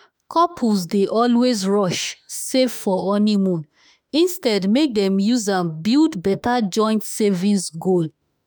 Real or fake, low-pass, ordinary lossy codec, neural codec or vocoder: fake; none; none; autoencoder, 48 kHz, 32 numbers a frame, DAC-VAE, trained on Japanese speech